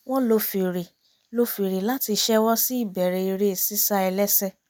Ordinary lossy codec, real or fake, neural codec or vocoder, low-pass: none; real; none; none